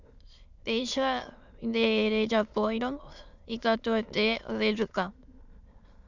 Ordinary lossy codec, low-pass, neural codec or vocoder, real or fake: Opus, 64 kbps; 7.2 kHz; autoencoder, 22.05 kHz, a latent of 192 numbers a frame, VITS, trained on many speakers; fake